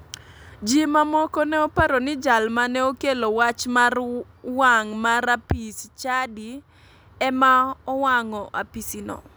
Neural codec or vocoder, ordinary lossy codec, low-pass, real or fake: none; none; none; real